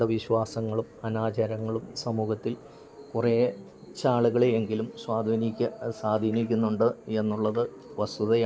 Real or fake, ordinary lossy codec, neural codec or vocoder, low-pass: real; none; none; none